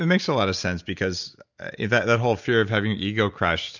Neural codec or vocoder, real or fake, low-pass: none; real; 7.2 kHz